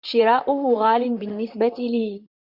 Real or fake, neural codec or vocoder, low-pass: fake; vocoder, 44.1 kHz, 128 mel bands, Pupu-Vocoder; 5.4 kHz